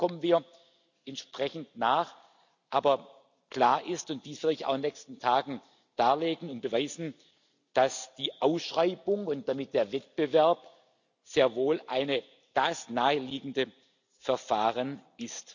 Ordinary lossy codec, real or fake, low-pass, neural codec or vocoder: none; real; 7.2 kHz; none